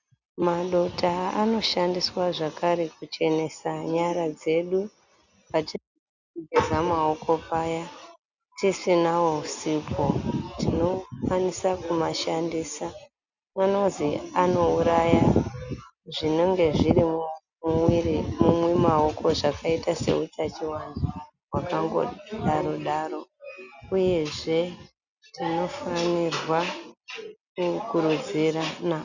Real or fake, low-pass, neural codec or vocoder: real; 7.2 kHz; none